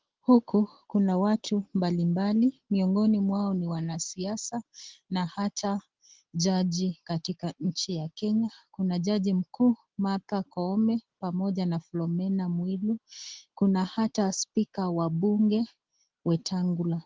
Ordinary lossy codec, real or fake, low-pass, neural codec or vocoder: Opus, 16 kbps; real; 7.2 kHz; none